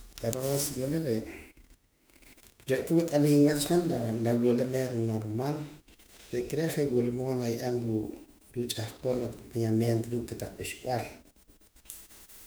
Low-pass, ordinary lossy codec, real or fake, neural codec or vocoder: none; none; fake; autoencoder, 48 kHz, 32 numbers a frame, DAC-VAE, trained on Japanese speech